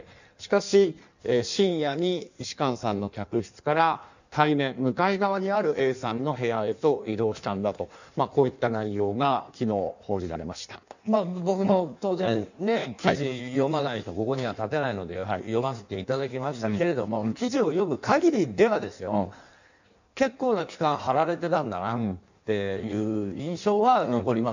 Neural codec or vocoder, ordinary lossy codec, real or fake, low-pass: codec, 16 kHz in and 24 kHz out, 1.1 kbps, FireRedTTS-2 codec; none; fake; 7.2 kHz